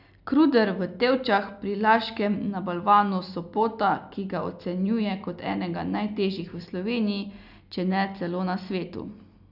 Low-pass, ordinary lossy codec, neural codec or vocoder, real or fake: 5.4 kHz; none; none; real